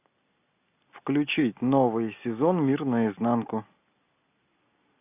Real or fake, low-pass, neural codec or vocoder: real; 3.6 kHz; none